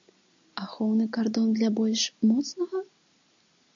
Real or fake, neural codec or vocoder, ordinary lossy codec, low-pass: real; none; AAC, 64 kbps; 7.2 kHz